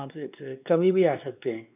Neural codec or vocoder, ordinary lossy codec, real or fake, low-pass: codec, 16 kHz, 2 kbps, X-Codec, WavLM features, trained on Multilingual LibriSpeech; none; fake; 3.6 kHz